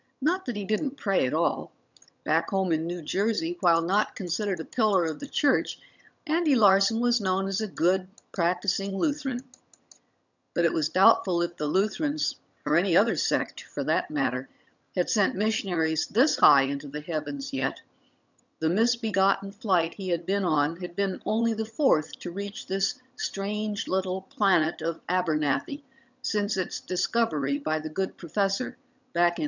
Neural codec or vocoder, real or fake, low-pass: vocoder, 22.05 kHz, 80 mel bands, HiFi-GAN; fake; 7.2 kHz